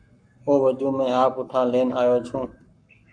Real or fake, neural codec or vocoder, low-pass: fake; codec, 44.1 kHz, 7.8 kbps, Pupu-Codec; 9.9 kHz